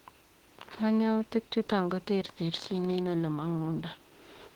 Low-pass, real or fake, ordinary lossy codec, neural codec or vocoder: 19.8 kHz; fake; Opus, 16 kbps; autoencoder, 48 kHz, 32 numbers a frame, DAC-VAE, trained on Japanese speech